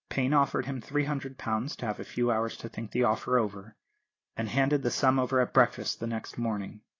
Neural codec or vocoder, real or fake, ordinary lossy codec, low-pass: none; real; AAC, 32 kbps; 7.2 kHz